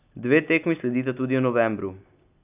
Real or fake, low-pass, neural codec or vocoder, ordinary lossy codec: real; 3.6 kHz; none; none